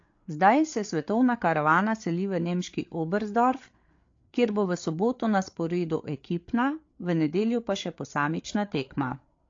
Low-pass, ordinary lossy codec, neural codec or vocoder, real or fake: 7.2 kHz; AAC, 48 kbps; codec, 16 kHz, 8 kbps, FreqCodec, larger model; fake